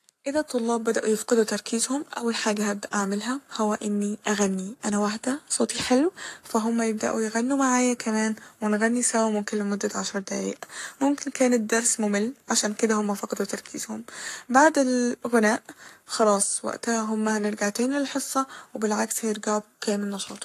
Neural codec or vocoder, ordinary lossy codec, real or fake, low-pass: codec, 44.1 kHz, 7.8 kbps, Pupu-Codec; AAC, 64 kbps; fake; 14.4 kHz